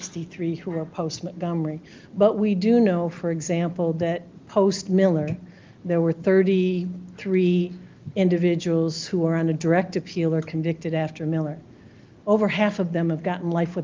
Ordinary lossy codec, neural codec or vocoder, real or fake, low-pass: Opus, 24 kbps; none; real; 7.2 kHz